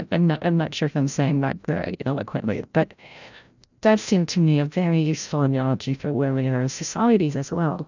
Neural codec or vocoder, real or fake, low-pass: codec, 16 kHz, 0.5 kbps, FreqCodec, larger model; fake; 7.2 kHz